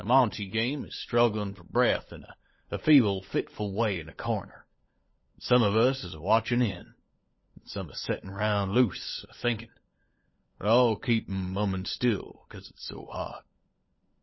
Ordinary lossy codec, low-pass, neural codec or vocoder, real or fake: MP3, 24 kbps; 7.2 kHz; codec, 16 kHz, 16 kbps, FunCodec, trained on LibriTTS, 50 frames a second; fake